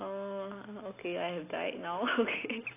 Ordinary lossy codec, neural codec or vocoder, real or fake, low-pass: AAC, 24 kbps; none; real; 3.6 kHz